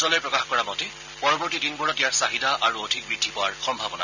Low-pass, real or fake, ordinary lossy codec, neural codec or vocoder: 7.2 kHz; real; none; none